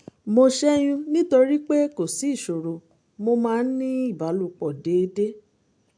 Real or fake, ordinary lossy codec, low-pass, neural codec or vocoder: real; AAC, 64 kbps; 9.9 kHz; none